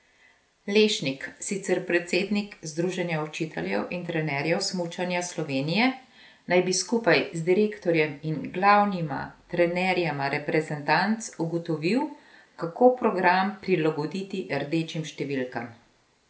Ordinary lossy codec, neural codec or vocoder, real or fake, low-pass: none; none; real; none